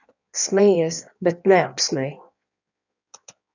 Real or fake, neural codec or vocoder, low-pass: fake; codec, 16 kHz in and 24 kHz out, 1.1 kbps, FireRedTTS-2 codec; 7.2 kHz